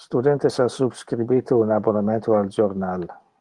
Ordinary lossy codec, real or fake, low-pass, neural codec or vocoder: Opus, 24 kbps; real; 10.8 kHz; none